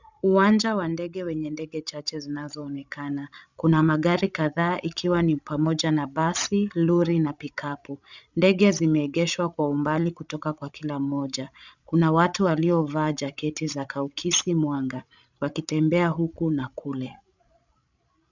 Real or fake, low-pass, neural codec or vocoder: fake; 7.2 kHz; codec, 16 kHz, 16 kbps, FreqCodec, larger model